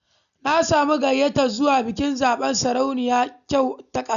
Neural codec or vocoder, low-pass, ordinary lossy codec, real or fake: none; 7.2 kHz; none; real